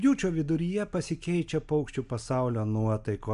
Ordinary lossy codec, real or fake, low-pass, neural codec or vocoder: AAC, 96 kbps; real; 10.8 kHz; none